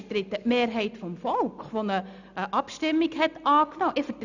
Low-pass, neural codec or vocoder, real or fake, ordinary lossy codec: 7.2 kHz; none; real; none